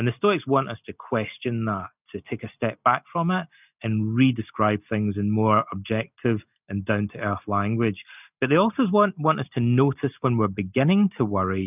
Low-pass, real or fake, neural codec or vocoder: 3.6 kHz; real; none